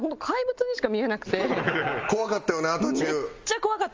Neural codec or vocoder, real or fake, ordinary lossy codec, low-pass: none; real; Opus, 32 kbps; 7.2 kHz